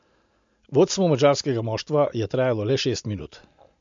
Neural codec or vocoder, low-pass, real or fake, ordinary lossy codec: none; 7.2 kHz; real; MP3, 64 kbps